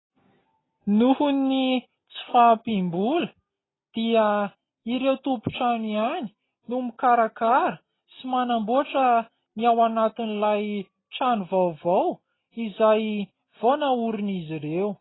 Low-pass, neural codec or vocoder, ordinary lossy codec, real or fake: 7.2 kHz; none; AAC, 16 kbps; real